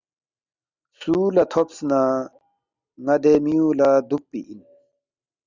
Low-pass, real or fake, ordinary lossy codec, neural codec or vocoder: 7.2 kHz; real; Opus, 64 kbps; none